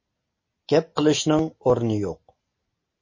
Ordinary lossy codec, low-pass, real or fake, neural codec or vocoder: MP3, 32 kbps; 7.2 kHz; real; none